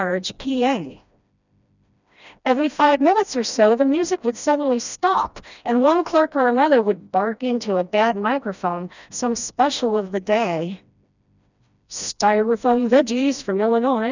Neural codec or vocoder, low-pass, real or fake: codec, 16 kHz, 1 kbps, FreqCodec, smaller model; 7.2 kHz; fake